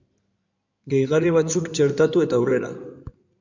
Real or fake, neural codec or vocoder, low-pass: fake; codec, 16 kHz in and 24 kHz out, 2.2 kbps, FireRedTTS-2 codec; 7.2 kHz